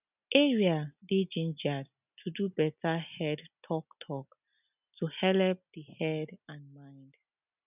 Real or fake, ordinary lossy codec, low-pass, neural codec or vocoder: real; none; 3.6 kHz; none